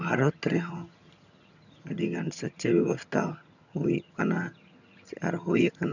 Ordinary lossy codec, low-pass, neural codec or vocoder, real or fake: none; 7.2 kHz; vocoder, 22.05 kHz, 80 mel bands, HiFi-GAN; fake